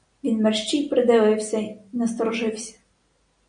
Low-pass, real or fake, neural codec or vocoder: 9.9 kHz; real; none